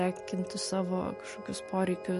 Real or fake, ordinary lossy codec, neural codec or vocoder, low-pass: real; MP3, 48 kbps; none; 14.4 kHz